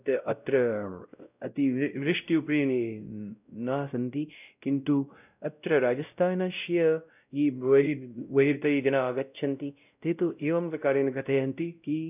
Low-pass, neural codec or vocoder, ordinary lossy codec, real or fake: 3.6 kHz; codec, 16 kHz, 0.5 kbps, X-Codec, WavLM features, trained on Multilingual LibriSpeech; none; fake